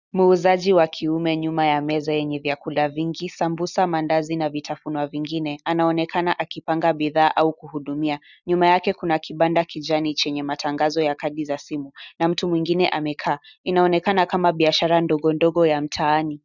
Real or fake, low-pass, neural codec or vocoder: real; 7.2 kHz; none